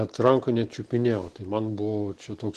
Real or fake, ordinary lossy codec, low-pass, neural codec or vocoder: real; Opus, 16 kbps; 14.4 kHz; none